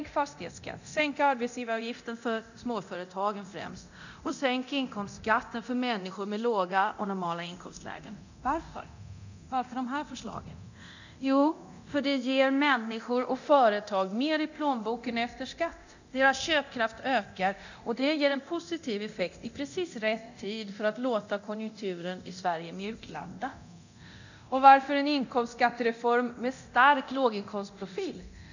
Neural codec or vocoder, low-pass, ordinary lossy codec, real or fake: codec, 24 kHz, 0.9 kbps, DualCodec; 7.2 kHz; none; fake